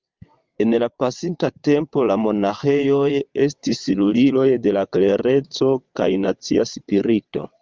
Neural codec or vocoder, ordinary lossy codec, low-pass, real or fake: vocoder, 22.05 kHz, 80 mel bands, WaveNeXt; Opus, 24 kbps; 7.2 kHz; fake